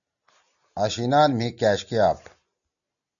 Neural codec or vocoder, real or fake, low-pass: none; real; 7.2 kHz